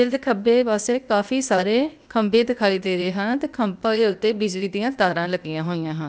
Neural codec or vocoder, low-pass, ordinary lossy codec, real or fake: codec, 16 kHz, 0.8 kbps, ZipCodec; none; none; fake